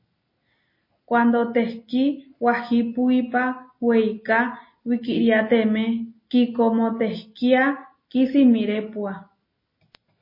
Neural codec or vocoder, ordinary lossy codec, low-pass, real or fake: none; MP3, 24 kbps; 5.4 kHz; real